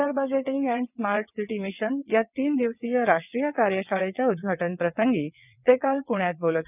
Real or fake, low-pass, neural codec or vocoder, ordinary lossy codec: fake; 3.6 kHz; vocoder, 22.05 kHz, 80 mel bands, WaveNeXt; none